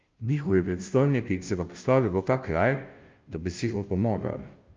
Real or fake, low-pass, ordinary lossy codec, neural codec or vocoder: fake; 7.2 kHz; Opus, 24 kbps; codec, 16 kHz, 0.5 kbps, FunCodec, trained on Chinese and English, 25 frames a second